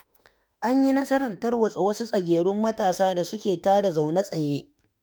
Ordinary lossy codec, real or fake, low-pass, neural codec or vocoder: none; fake; none; autoencoder, 48 kHz, 32 numbers a frame, DAC-VAE, trained on Japanese speech